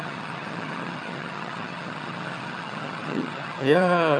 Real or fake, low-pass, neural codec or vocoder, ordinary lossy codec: fake; none; vocoder, 22.05 kHz, 80 mel bands, HiFi-GAN; none